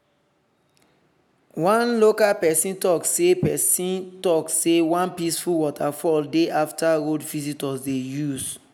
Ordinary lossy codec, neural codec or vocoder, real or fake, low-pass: none; none; real; none